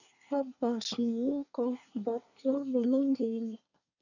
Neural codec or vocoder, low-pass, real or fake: codec, 24 kHz, 1 kbps, SNAC; 7.2 kHz; fake